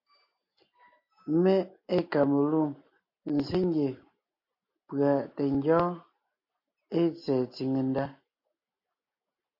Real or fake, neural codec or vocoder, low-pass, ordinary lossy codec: real; none; 5.4 kHz; MP3, 32 kbps